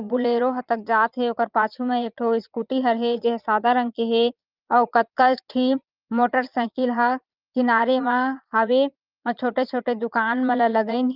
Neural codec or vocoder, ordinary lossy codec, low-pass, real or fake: vocoder, 22.05 kHz, 80 mel bands, Vocos; Opus, 24 kbps; 5.4 kHz; fake